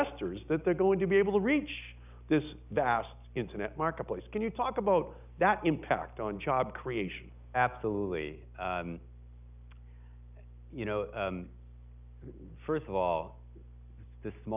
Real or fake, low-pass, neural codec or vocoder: real; 3.6 kHz; none